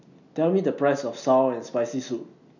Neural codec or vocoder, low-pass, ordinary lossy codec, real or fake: none; 7.2 kHz; none; real